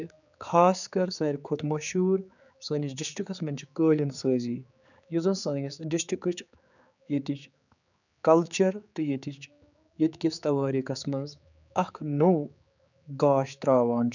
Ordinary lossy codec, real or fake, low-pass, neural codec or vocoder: none; fake; 7.2 kHz; codec, 16 kHz, 4 kbps, X-Codec, HuBERT features, trained on general audio